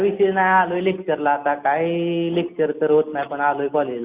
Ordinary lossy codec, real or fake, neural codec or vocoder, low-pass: Opus, 64 kbps; real; none; 3.6 kHz